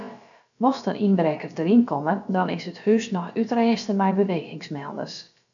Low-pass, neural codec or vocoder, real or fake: 7.2 kHz; codec, 16 kHz, about 1 kbps, DyCAST, with the encoder's durations; fake